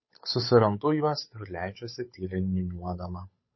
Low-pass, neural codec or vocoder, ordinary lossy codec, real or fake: 7.2 kHz; codec, 16 kHz, 8 kbps, FunCodec, trained on Chinese and English, 25 frames a second; MP3, 24 kbps; fake